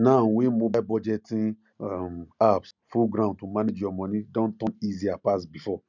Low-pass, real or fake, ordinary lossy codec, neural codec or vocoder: 7.2 kHz; real; none; none